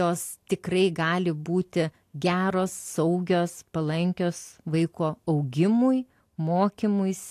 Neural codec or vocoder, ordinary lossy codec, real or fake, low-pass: none; AAC, 64 kbps; real; 14.4 kHz